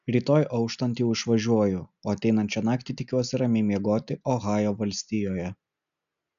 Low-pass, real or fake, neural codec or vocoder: 7.2 kHz; real; none